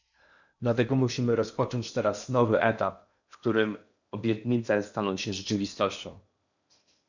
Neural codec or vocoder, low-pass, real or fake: codec, 16 kHz in and 24 kHz out, 0.8 kbps, FocalCodec, streaming, 65536 codes; 7.2 kHz; fake